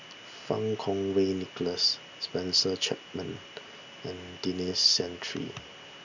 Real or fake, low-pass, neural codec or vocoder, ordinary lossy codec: real; 7.2 kHz; none; none